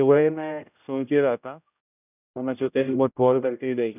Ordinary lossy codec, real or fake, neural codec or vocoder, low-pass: none; fake; codec, 16 kHz, 0.5 kbps, X-Codec, HuBERT features, trained on general audio; 3.6 kHz